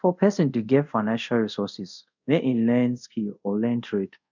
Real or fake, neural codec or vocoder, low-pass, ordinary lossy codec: fake; codec, 24 kHz, 0.5 kbps, DualCodec; 7.2 kHz; none